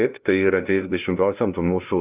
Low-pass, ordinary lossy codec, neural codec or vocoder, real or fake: 3.6 kHz; Opus, 24 kbps; codec, 16 kHz, 0.5 kbps, FunCodec, trained on LibriTTS, 25 frames a second; fake